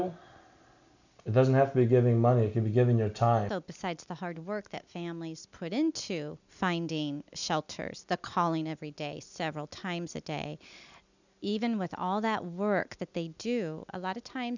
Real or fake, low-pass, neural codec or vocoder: real; 7.2 kHz; none